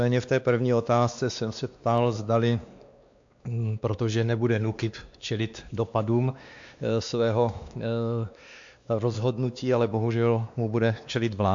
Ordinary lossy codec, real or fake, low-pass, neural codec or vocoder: AAC, 64 kbps; fake; 7.2 kHz; codec, 16 kHz, 2 kbps, X-Codec, WavLM features, trained on Multilingual LibriSpeech